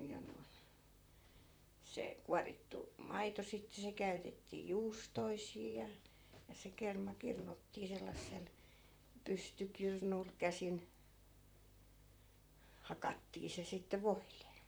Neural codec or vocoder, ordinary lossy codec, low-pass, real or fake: vocoder, 44.1 kHz, 128 mel bands, Pupu-Vocoder; none; none; fake